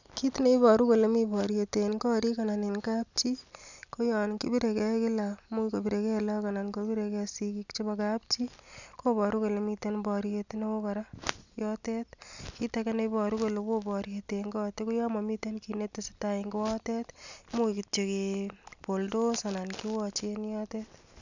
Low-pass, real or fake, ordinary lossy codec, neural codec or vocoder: 7.2 kHz; real; none; none